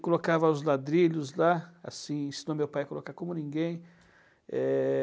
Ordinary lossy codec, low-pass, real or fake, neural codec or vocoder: none; none; real; none